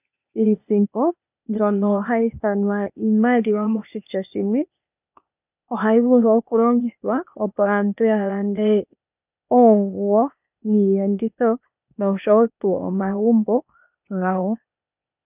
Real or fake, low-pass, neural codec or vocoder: fake; 3.6 kHz; codec, 16 kHz, 0.8 kbps, ZipCodec